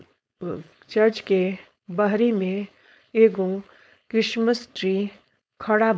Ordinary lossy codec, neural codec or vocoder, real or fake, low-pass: none; codec, 16 kHz, 4.8 kbps, FACodec; fake; none